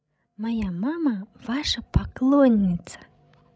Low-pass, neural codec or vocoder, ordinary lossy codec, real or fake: none; codec, 16 kHz, 16 kbps, FreqCodec, larger model; none; fake